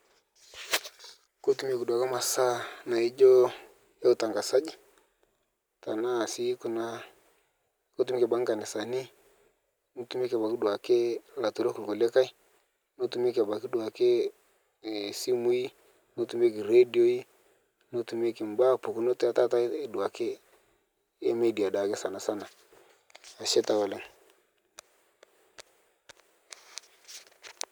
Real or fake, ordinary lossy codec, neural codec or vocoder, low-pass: real; none; none; none